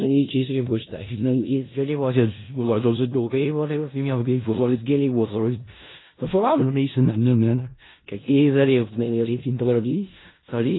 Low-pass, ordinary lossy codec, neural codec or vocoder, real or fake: 7.2 kHz; AAC, 16 kbps; codec, 16 kHz in and 24 kHz out, 0.4 kbps, LongCat-Audio-Codec, four codebook decoder; fake